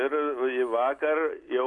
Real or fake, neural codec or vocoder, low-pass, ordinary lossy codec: real; none; 10.8 kHz; Opus, 64 kbps